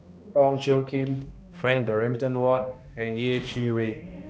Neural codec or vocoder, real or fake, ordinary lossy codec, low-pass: codec, 16 kHz, 1 kbps, X-Codec, HuBERT features, trained on balanced general audio; fake; none; none